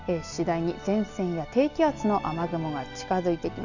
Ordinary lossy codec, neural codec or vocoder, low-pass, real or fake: none; none; 7.2 kHz; real